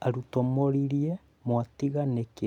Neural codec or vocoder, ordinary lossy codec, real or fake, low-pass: none; none; real; 19.8 kHz